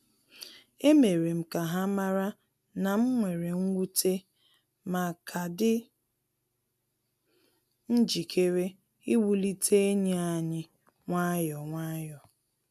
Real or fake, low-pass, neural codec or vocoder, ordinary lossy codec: real; 14.4 kHz; none; none